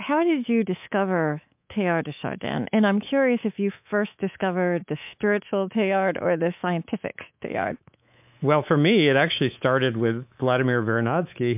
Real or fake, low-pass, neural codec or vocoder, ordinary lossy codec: fake; 3.6 kHz; codec, 24 kHz, 3.1 kbps, DualCodec; MP3, 32 kbps